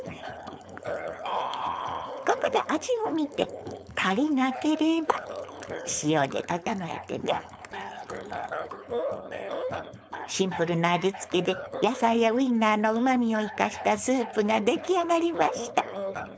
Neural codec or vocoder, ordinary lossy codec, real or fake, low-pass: codec, 16 kHz, 4.8 kbps, FACodec; none; fake; none